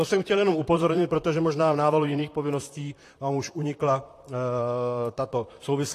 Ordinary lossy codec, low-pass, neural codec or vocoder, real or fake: AAC, 48 kbps; 14.4 kHz; vocoder, 44.1 kHz, 128 mel bands, Pupu-Vocoder; fake